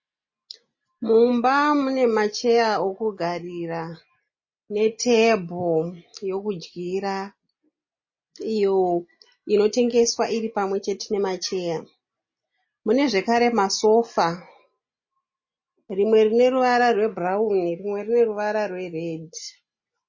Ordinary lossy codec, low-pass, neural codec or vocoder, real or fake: MP3, 32 kbps; 7.2 kHz; none; real